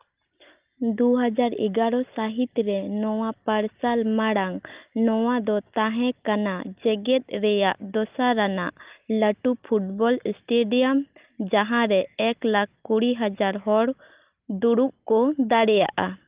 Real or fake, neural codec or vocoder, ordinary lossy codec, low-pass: real; none; Opus, 32 kbps; 3.6 kHz